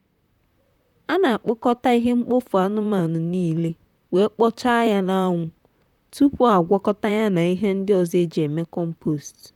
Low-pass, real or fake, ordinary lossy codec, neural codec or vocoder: 19.8 kHz; fake; none; vocoder, 44.1 kHz, 128 mel bands, Pupu-Vocoder